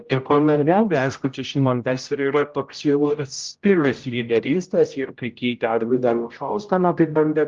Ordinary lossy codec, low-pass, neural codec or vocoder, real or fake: Opus, 32 kbps; 7.2 kHz; codec, 16 kHz, 0.5 kbps, X-Codec, HuBERT features, trained on general audio; fake